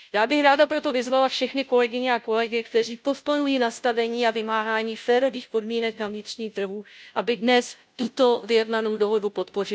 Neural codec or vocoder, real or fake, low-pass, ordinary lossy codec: codec, 16 kHz, 0.5 kbps, FunCodec, trained on Chinese and English, 25 frames a second; fake; none; none